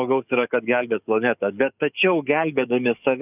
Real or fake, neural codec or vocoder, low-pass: fake; codec, 44.1 kHz, 7.8 kbps, DAC; 3.6 kHz